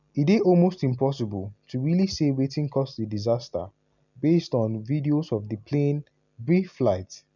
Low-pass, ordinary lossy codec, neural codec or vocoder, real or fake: 7.2 kHz; none; none; real